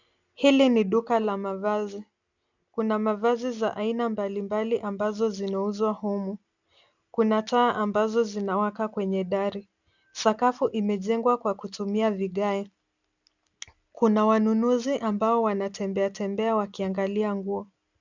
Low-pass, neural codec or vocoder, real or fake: 7.2 kHz; none; real